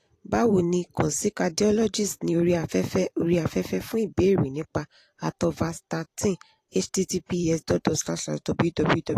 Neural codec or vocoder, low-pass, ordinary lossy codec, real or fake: vocoder, 44.1 kHz, 128 mel bands every 512 samples, BigVGAN v2; 14.4 kHz; AAC, 48 kbps; fake